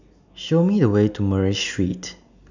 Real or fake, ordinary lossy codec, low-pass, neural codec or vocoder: real; none; 7.2 kHz; none